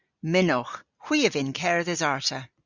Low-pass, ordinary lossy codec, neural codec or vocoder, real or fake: 7.2 kHz; Opus, 64 kbps; none; real